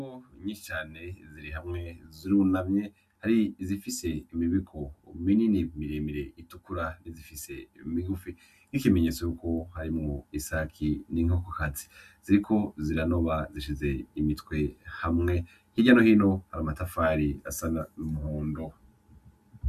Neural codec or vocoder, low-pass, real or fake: none; 14.4 kHz; real